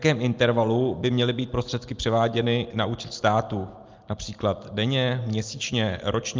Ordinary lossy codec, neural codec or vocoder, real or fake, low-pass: Opus, 32 kbps; none; real; 7.2 kHz